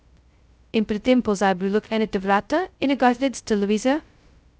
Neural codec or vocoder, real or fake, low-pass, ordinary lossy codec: codec, 16 kHz, 0.2 kbps, FocalCodec; fake; none; none